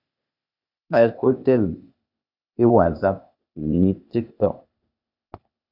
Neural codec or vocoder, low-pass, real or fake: codec, 16 kHz, 0.8 kbps, ZipCodec; 5.4 kHz; fake